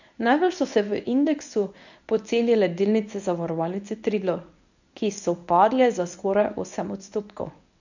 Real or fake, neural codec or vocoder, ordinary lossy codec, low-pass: fake; codec, 24 kHz, 0.9 kbps, WavTokenizer, medium speech release version 1; none; 7.2 kHz